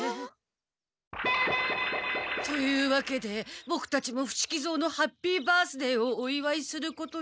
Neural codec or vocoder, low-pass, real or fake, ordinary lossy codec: none; none; real; none